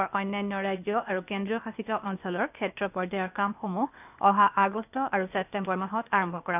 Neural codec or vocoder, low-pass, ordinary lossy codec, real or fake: codec, 16 kHz, 0.8 kbps, ZipCodec; 3.6 kHz; none; fake